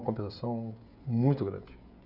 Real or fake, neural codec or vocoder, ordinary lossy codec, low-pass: fake; codec, 16 kHz, 16 kbps, FreqCodec, smaller model; none; 5.4 kHz